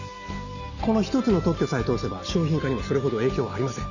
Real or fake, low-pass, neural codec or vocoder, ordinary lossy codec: real; 7.2 kHz; none; none